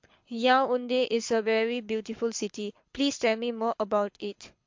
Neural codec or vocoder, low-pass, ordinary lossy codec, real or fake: codec, 24 kHz, 6 kbps, HILCodec; 7.2 kHz; MP3, 48 kbps; fake